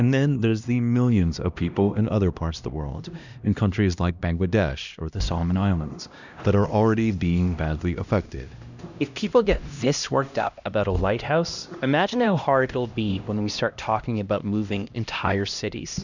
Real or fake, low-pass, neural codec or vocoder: fake; 7.2 kHz; codec, 16 kHz, 1 kbps, X-Codec, HuBERT features, trained on LibriSpeech